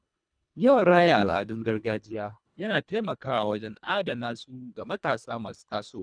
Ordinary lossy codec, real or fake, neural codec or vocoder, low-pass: none; fake; codec, 24 kHz, 1.5 kbps, HILCodec; 9.9 kHz